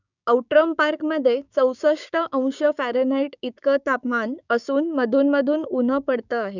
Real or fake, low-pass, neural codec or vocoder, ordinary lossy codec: fake; 7.2 kHz; codec, 16 kHz, 6 kbps, DAC; none